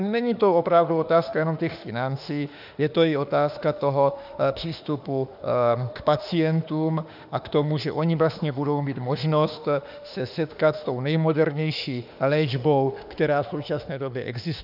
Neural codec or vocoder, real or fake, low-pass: autoencoder, 48 kHz, 32 numbers a frame, DAC-VAE, trained on Japanese speech; fake; 5.4 kHz